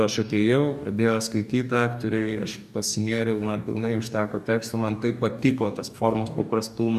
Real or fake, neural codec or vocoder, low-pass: fake; codec, 44.1 kHz, 2.6 kbps, DAC; 14.4 kHz